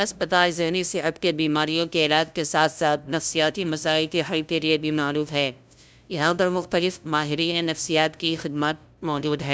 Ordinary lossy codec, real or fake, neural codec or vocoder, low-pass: none; fake; codec, 16 kHz, 0.5 kbps, FunCodec, trained on LibriTTS, 25 frames a second; none